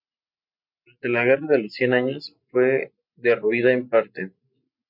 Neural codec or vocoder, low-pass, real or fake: none; 5.4 kHz; real